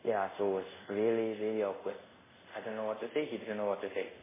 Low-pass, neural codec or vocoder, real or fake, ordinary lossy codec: 3.6 kHz; codec, 24 kHz, 0.5 kbps, DualCodec; fake; MP3, 16 kbps